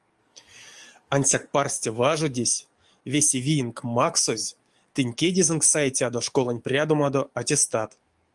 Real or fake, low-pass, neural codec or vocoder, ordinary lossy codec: fake; 10.8 kHz; codec, 44.1 kHz, 7.8 kbps, DAC; Opus, 32 kbps